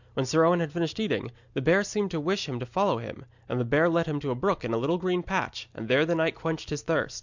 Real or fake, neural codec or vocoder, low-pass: real; none; 7.2 kHz